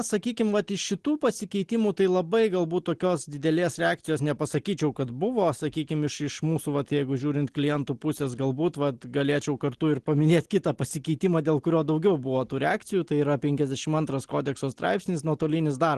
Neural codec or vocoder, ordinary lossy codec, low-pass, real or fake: none; Opus, 16 kbps; 10.8 kHz; real